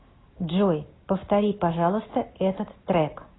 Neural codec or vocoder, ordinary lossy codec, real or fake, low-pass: none; AAC, 16 kbps; real; 7.2 kHz